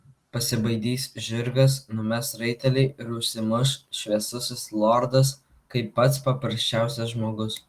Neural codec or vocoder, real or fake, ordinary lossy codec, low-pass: none; real; Opus, 24 kbps; 14.4 kHz